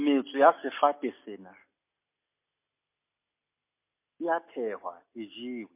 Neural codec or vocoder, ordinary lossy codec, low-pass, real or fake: none; MP3, 24 kbps; 3.6 kHz; real